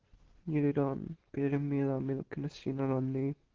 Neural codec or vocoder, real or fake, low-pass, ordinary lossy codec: none; real; 7.2 kHz; Opus, 16 kbps